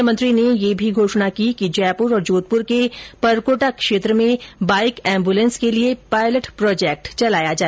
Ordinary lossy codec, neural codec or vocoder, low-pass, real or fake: none; none; none; real